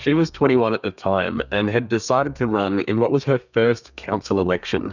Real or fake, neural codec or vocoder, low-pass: fake; codec, 32 kHz, 1.9 kbps, SNAC; 7.2 kHz